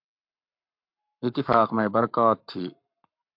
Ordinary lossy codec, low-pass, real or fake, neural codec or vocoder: MP3, 48 kbps; 5.4 kHz; fake; codec, 44.1 kHz, 7.8 kbps, Pupu-Codec